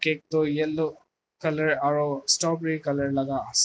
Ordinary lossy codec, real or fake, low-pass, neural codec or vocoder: none; real; none; none